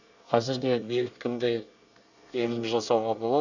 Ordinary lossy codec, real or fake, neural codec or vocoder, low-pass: none; fake; codec, 24 kHz, 1 kbps, SNAC; 7.2 kHz